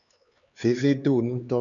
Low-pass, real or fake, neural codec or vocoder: 7.2 kHz; fake; codec, 16 kHz, 4 kbps, X-Codec, HuBERT features, trained on LibriSpeech